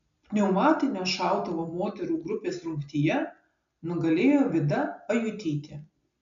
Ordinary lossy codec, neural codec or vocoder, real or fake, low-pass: MP3, 64 kbps; none; real; 7.2 kHz